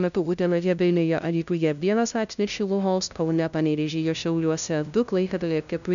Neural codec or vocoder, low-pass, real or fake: codec, 16 kHz, 0.5 kbps, FunCodec, trained on LibriTTS, 25 frames a second; 7.2 kHz; fake